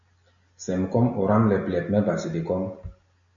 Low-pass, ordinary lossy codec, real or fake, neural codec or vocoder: 7.2 kHz; MP3, 96 kbps; real; none